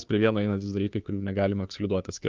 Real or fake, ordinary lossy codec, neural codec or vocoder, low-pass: fake; Opus, 24 kbps; codec, 16 kHz, 2 kbps, FunCodec, trained on Chinese and English, 25 frames a second; 7.2 kHz